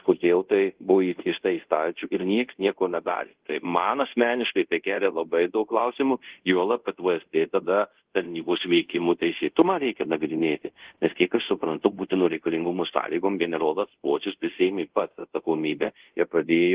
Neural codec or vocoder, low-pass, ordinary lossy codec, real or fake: codec, 24 kHz, 0.5 kbps, DualCodec; 3.6 kHz; Opus, 16 kbps; fake